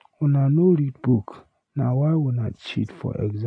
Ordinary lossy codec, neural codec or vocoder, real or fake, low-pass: AAC, 48 kbps; none; real; 9.9 kHz